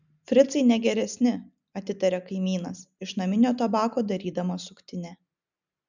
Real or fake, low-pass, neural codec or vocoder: real; 7.2 kHz; none